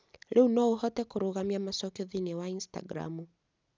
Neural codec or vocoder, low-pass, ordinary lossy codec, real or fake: none; none; none; real